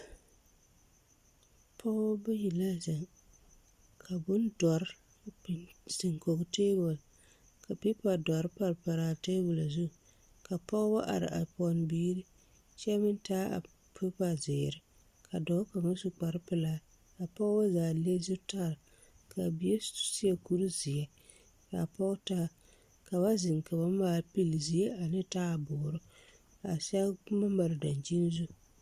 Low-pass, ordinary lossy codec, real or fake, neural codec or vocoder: 14.4 kHz; Opus, 64 kbps; real; none